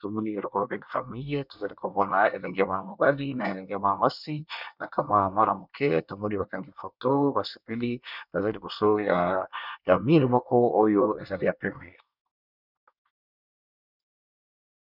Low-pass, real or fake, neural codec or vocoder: 5.4 kHz; fake; codec, 24 kHz, 1 kbps, SNAC